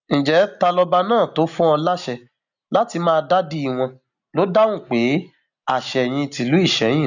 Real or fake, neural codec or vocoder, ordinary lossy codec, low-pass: real; none; none; 7.2 kHz